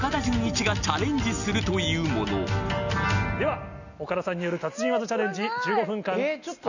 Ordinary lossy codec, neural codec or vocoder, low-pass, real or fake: none; none; 7.2 kHz; real